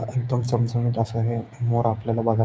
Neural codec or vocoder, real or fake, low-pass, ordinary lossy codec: codec, 16 kHz, 6 kbps, DAC; fake; none; none